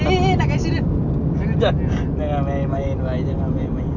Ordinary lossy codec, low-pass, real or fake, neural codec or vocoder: none; 7.2 kHz; real; none